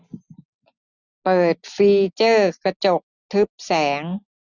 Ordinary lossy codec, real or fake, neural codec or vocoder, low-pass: none; real; none; 7.2 kHz